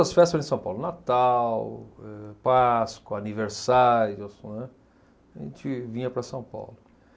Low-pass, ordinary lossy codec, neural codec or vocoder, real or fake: none; none; none; real